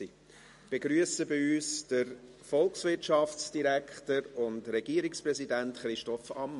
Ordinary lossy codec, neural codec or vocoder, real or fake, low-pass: MP3, 48 kbps; none; real; 14.4 kHz